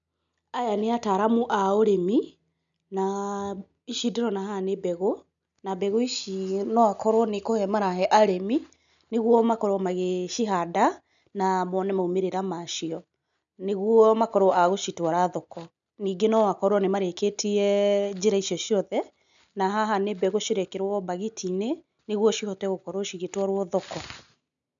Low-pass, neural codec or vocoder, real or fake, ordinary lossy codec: 7.2 kHz; none; real; none